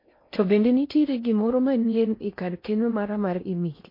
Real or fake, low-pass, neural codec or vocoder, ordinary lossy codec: fake; 5.4 kHz; codec, 16 kHz in and 24 kHz out, 0.6 kbps, FocalCodec, streaming, 4096 codes; MP3, 32 kbps